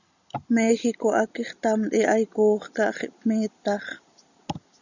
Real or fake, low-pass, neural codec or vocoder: real; 7.2 kHz; none